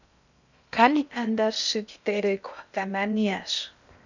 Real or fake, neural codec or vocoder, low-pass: fake; codec, 16 kHz in and 24 kHz out, 0.6 kbps, FocalCodec, streaming, 2048 codes; 7.2 kHz